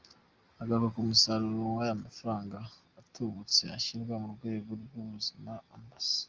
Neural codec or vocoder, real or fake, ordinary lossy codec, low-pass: none; real; Opus, 24 kbps; 7.2 kHz